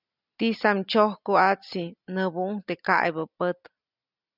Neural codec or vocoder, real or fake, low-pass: none; real; 5.4 kHz